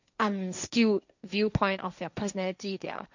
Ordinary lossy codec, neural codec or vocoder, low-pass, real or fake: none; codec, 16 kHz, 1.1 kbps, Voila-Tokenizer; 7.2 kHz; fake